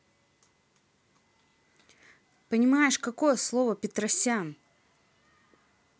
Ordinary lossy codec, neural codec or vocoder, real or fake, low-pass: none; none; real; none